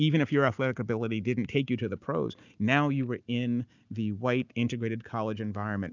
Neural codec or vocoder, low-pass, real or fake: none; 7.2 kHz; real